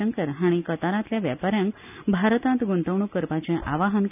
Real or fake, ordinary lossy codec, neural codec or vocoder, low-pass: real; none; none; 3.6 kHz